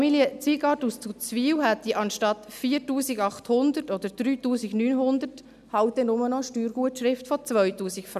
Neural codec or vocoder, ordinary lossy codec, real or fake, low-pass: none; none; real; 14.4 kHz